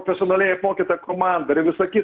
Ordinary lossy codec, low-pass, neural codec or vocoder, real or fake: Opus, 32 kbps; 7.2 kHz; none; real